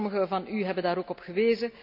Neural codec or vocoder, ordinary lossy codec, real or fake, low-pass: none; none; real; 5.4 kHz